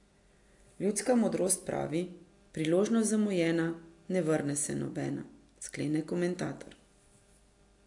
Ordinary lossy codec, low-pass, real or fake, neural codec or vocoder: AAC, 64 kbps; 10.8 kHz; real; none